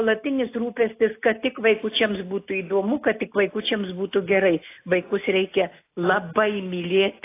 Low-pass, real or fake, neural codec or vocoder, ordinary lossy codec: 3.6 kHz; real; none; AAC, 24 kbps